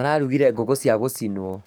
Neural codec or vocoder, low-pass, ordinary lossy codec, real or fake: codec, 44.1 kHz, 7.8 kbps, DAC; none; none; fake